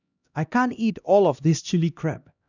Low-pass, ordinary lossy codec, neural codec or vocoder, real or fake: 7.2 kHz; none; codec, 16 kHz, 1 kbps, X-Codec, HuBERT features, trained on LibriSpeech; fake